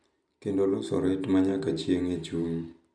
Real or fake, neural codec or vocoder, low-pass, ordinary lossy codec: real; none; 9.9 kHz; none